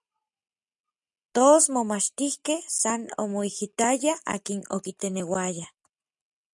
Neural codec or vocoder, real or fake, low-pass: none; real; 10.8 kHz